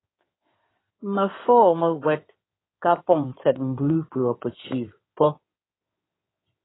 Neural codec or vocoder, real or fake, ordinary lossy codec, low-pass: codec, 16 kHz in and 24 kHz out, 2.2 kbps, FireRedTTS-2 codec; fake; AAC, 16 kbps; 7.2 kHz